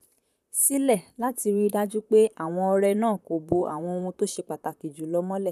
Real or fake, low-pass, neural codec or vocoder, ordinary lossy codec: fake; 14.4 kHz; vocoder, 44.1 kHz, 128 mel bands, Pupu-Vocoder; none